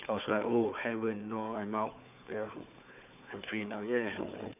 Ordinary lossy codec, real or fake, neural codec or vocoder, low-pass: none; fake; codec, 16 kHz, 4 kbps, FunCodec, trained on Chinese and English, 50 frames a second; 3.6 kHz